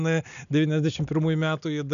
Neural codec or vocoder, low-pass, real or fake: none; 7.2 kHz; real